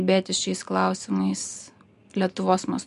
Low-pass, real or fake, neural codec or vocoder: 10.8 kHz; real; none